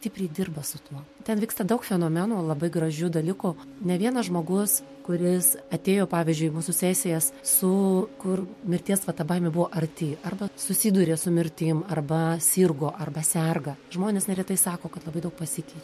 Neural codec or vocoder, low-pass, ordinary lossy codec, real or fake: none; 14.4 kHz; MP3, 64 kbps; real